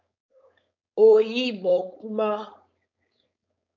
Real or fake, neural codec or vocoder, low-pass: fake; codec, 16 kHz, 4.8 kbps, FACodec; 7.2 kHz